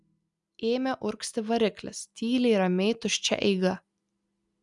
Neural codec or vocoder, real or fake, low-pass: none; real; 10.8 kHz